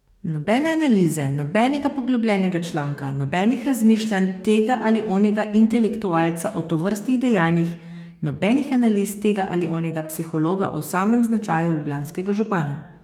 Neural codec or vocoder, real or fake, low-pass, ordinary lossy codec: codec, 44.1 kHz, 2.6 kbps, DAC; fake; 19.8 kHz; none